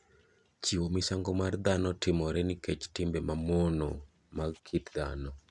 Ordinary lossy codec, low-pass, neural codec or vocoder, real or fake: none; 10.8 kHz; none; real